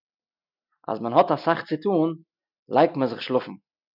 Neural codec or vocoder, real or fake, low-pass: none; real; 5.4 kHz